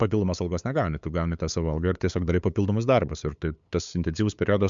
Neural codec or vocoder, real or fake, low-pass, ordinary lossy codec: codec, 16 kHz, 8 kbps, FunCodec, trained on LibriTTS, 25 frames a second; fake; 7.2 kHz; MP3, 64 kbps